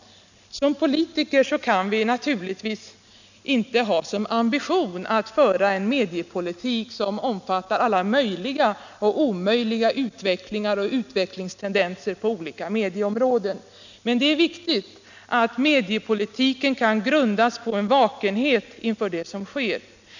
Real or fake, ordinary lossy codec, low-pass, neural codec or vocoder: real; none; 7.2 kHz; none